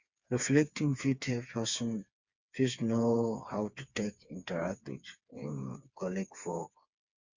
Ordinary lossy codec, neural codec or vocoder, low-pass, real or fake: Opus, 64 kbps; codec, 16 kHz, 4 kbps, FreqCodec, smaller model; 7.2 kHz; fake